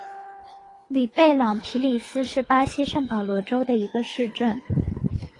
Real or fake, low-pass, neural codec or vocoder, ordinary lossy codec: fake; 10.8 kHz; codec, 24 kHz, 3 kbps, HILCodec; AAC, 32 kbps